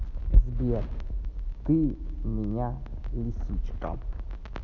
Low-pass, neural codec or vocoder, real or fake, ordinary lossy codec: 7.2 kHz; none; real; none